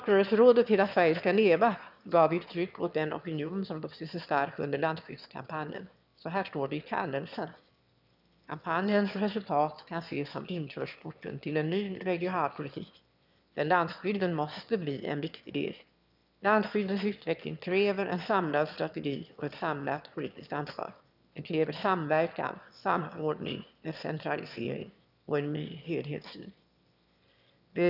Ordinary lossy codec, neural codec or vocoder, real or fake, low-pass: none; autoencoder, 22.05 kHz, a latent of 192 numbers a frame, VITS, trained on one speaker; fake; 5.4 kHz